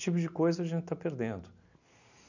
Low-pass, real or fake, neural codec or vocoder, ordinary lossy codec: 7.2 kHz; real; none; none